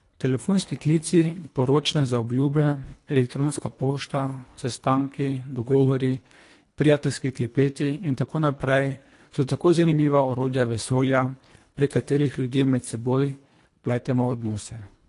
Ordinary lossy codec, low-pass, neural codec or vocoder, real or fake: AAC, 48 kbps; 10.8 kHz; codec, 24 kHz, 1.5 kbps, HILCodec; fake